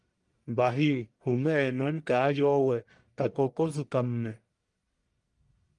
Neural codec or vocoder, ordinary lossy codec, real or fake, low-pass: codec, 44.1 kHz, 1.7 kbps, Pupu-Codec; Opus, 32 kbps; fake; 10.8 kHz